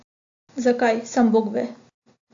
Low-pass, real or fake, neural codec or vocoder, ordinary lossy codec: 7.2 kHz; real; none; AAC, 48 kbps